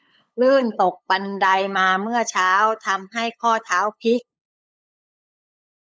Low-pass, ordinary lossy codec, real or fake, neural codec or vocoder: none; none; fake; codec, 16 kHz, 8 kbps, FunCodec, trained on LibriTTS, 25 frames a second